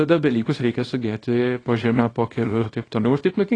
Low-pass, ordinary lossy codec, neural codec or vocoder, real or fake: 9.9 kHz; AAC, 32 kbps; codec, 24 kHz, 0.9 kbps, WavTokenizer, small release; fake